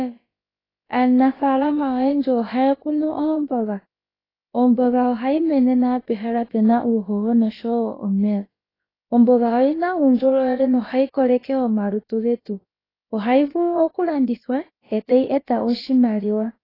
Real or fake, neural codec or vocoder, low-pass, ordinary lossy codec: fake; codec, 16 kHz, about 1 kbps, DyCAST, with the encoder's durations; 5.4 kHz; AAC, 24 kbps